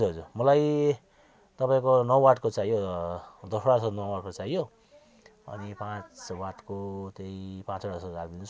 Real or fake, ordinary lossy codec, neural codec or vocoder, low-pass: real; none; none; none